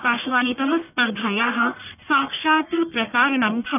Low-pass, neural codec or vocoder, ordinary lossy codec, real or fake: 3.6 kHz; codec, 44.1 kHz, 1.7 kbps, Pupu-Codec; none; fake